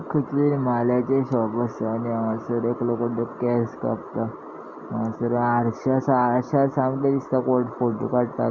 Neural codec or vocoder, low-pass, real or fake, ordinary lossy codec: none; 7.2 kHz; real; Opus, 64 kbps